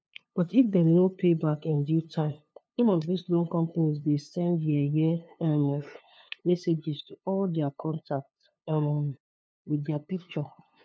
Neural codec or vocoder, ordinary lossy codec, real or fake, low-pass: codec, 16 kHz, 2 kbps, FunCodec, trained on LibriTTS, 25 frames a second; none; fake; none